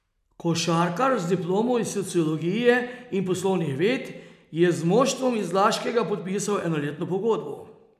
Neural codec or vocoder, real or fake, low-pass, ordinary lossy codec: none; real; 14.4 kHz; none